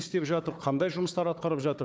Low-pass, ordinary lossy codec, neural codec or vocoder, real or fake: none; none; codec, 16 kHz, 4 kbps, FunCodec, trained on Chinese and English, 50 frames a second; fake